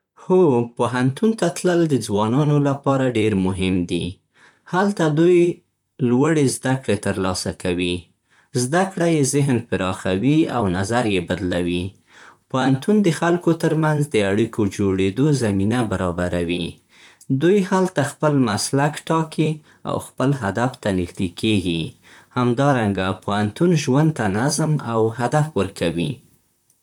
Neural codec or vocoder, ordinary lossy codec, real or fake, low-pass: vocoder, 44.1 kHz, 128 mel bands, Pupu-Vocoder; none; fake; 19.8 kHz